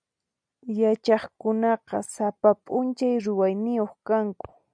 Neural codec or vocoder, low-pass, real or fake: none; 9.9 kHz; real